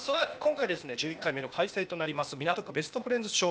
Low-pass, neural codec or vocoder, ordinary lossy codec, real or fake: none; codec, 16 kHz, 0.8 kbps, ZipCodec; none; fake